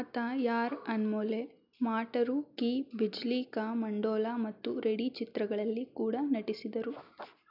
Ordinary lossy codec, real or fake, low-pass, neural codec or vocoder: none; real; 5.4 kHz; none